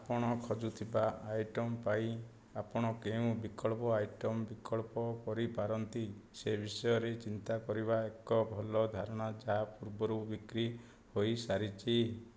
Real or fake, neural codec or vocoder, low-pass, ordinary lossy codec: real; none; none; none